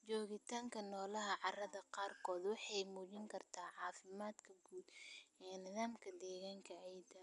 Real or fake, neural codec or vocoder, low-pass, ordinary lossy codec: real; none; 10.8 kHz; none